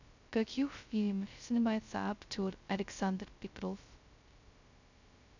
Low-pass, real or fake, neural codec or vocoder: 7.2 kHz; fake; codec, 16 kHz, 0.2 kbps, FocalCodec